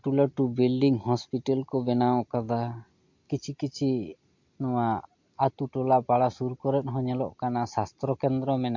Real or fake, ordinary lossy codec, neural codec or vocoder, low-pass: real; MP3, 48 kbps; none; 7.2 kHz